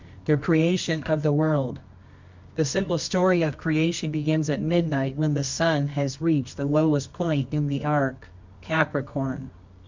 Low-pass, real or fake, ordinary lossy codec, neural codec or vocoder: 7.2 kHz; fake; MP3, 64 kbps; codec, 24 kHz, 0.9 kbps, WavTokenizer, medium music audio release